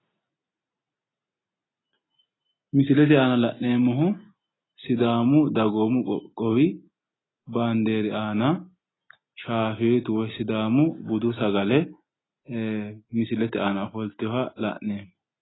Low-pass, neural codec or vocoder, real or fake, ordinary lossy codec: 7.2 kHz; none; real; AAC, 16 kbps